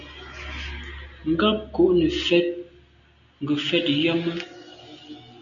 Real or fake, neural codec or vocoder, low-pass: real; none; 7.2 kHz